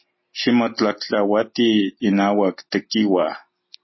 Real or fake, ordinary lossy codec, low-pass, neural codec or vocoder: real; MP3, 24 kbps; 7.2 kHz; none